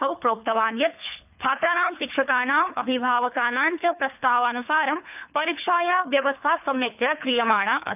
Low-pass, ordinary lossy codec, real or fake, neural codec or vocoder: 3.6 kHz; none; fake; codec, 24 kHz, 3 kbps, HILCodec